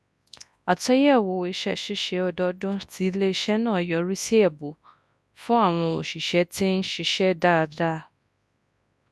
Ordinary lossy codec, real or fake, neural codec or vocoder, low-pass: none; fake; codec, 24 kHz, 0.9 kbps, WavTokenizer, large speech release; none